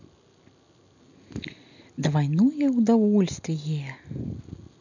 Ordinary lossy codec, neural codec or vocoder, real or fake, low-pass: none; none; real; 7.2 kHz